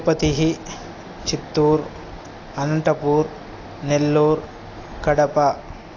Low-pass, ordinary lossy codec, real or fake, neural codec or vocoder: 7.2 kHz; AAC, 32 kbps; real; none